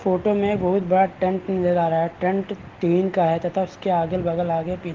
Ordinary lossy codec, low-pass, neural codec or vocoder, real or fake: Opus, 16 kbps; 7.2 kHz; none; real